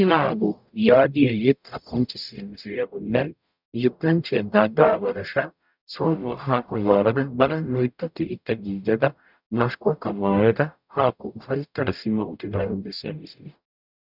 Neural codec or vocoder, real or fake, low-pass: codec, 44.1 kHz, 0.9 kbps, DAC; fake; 5.4 kHz